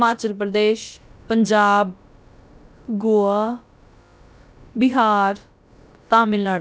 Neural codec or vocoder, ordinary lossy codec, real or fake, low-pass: codec, 16 kHz, about 1 kbps, DyCAST, with the encoder's durations; none; fake; none